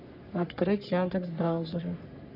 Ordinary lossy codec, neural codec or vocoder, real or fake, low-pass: none; codec, 44.1 kHz, 3.4 kbps, Pupu-Codec; fake; 5.4 kHz